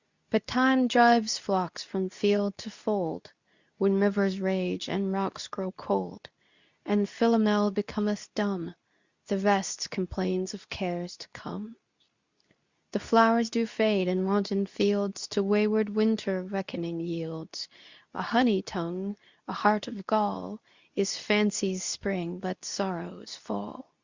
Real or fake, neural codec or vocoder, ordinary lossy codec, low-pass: fake; codec, 24 kHz, 0.9 kbps, WavTokenizer, medium speech release version 2; Opus, 64 kbps; 7.2 kHz